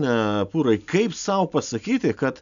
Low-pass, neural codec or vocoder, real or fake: 7.2 kHz; none; real